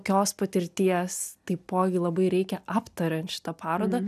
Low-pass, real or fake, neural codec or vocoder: 14.4 kHz; real; none